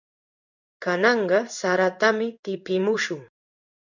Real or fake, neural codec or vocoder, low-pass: fake; codec, 16 kHz in and 24 kHz out, 1 kbps, XY-Tokenizer; 7.2 kHz